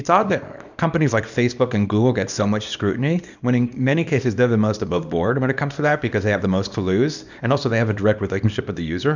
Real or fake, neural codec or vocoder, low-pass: fake; codec, 24 kHz, 0.9 kbps, WavTokenizer, small release; 7.2 kHz